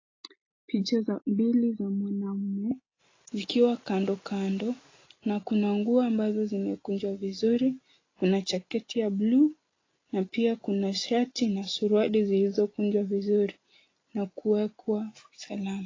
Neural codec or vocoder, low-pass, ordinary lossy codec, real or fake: none; 7.2 kHz; AAC, 32 kbps; real